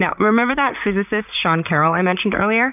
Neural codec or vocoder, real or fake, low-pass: vocoder, 44.1 kHz, 128 mel bands, Pupu-Vocoder; fake; 3.6 kHz